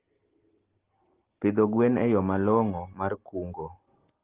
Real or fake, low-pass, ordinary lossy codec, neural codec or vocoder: real; 3.6 kHz; Opus, 16 kbps; none